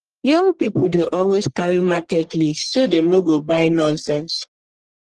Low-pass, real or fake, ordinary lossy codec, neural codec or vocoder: 10.8 kHz; fake; Opus, 16 kbps; codec, 44.1 kHz, 1.7 kbps, Pupu-Codec